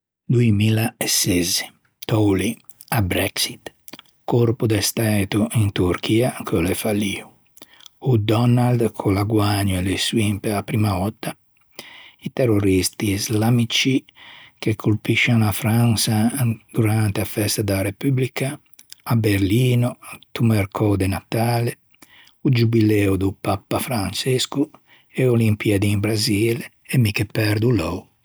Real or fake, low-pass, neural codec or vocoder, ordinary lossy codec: real; none; none; none